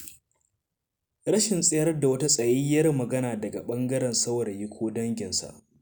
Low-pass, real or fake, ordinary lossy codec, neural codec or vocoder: none; real; none; none